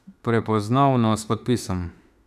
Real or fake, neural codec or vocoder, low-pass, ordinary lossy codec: fake; autoencoder, 48 kHz, 32 numbers a frame, DAC-VAE, trained on Japanese speech; 14.4 kHz; none